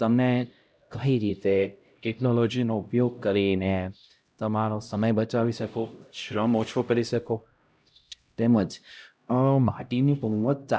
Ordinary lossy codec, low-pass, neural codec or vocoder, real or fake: none; none; codec, 16 kHz, 0.5 kbps, X-Codec, HuBERT features, trained on LibriSpeech; fake